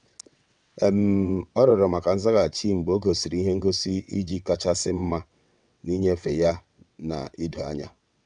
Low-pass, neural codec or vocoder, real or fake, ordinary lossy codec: 9.9 kHz; vocoder, 22.05 kHz, 80 mel bands, WaveNeXt; fake; none